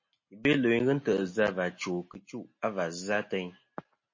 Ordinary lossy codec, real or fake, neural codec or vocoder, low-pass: MP3, 32 kbps; real; none; 7.2 kHz